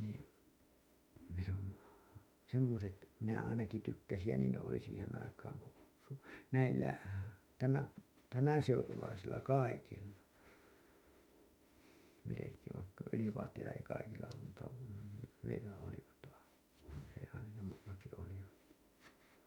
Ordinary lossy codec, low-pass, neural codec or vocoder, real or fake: MP3, 96 kbps; 19.8 kHz; autoencoder, 48 kHz, 32 numbers a frame, DAC-VAE, trained on Japanese speech; fake